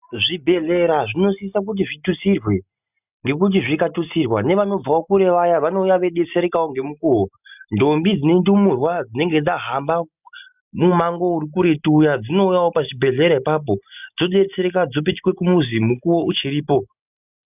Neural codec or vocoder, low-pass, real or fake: none; 3.6 kHz; real